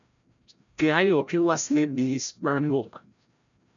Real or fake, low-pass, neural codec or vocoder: fake; 7.2 kHz; codec, 16 kHz, 0.5 kbps, FreqCodec, larger model